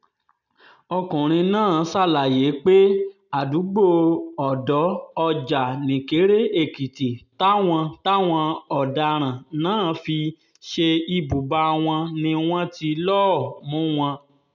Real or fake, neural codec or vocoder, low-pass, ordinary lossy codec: real; none; 7.2 kHz; none